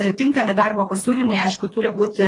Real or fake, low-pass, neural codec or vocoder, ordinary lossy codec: fake; 10.8 kHz; codec, 24 kHz, 1.5 kbps, HILCodec; AAC, 32 kbps